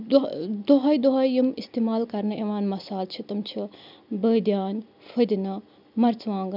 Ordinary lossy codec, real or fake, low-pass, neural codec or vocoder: none; real; 5.4 kHz; none